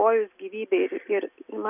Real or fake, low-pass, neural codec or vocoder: real; 3.6 kHz; none